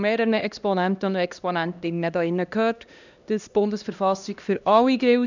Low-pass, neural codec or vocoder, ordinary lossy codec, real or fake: 7.2 kHz; codec, 16 kHz, 1 kbps, X-Codec, HuBERT features, trained on LibriSpeech; none; fake